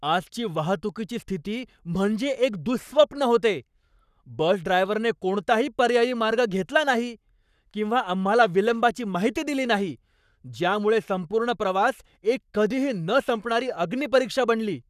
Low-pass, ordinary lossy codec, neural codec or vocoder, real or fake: 14.4 kHz; none; codec, 44.1 kHz, 7.8 kbps, Pupu-Codec; fake